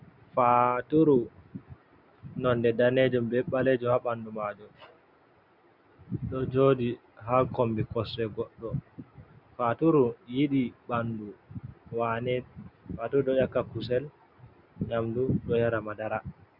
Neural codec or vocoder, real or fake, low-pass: none; real; 5.4 kHz